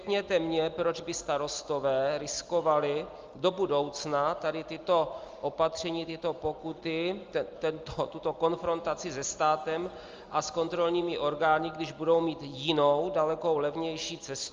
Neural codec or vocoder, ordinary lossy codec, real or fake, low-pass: none; Opus, 32 kbps; real; 7.2 kHz